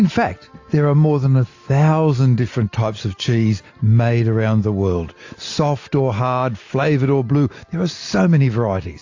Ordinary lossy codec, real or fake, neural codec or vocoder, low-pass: AAC, 48 kbps; real; none; 7.2 kHz